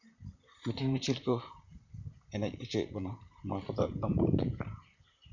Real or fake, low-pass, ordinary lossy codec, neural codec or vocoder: fake; 7.2 kHz; none; vocoder, 44.1 kHz, 128 mel bands, Pupu-Vocoder